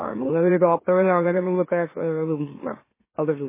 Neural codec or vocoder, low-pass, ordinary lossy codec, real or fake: autoencoder, 44.1 kHz, a latent of 192 numbers a frame, MeloTTS; 3.6 kHz; MP3, 16 kbps; fake